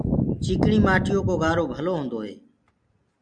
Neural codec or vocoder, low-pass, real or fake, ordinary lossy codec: none; 9.9 kHz; real; MP3, 96 kbps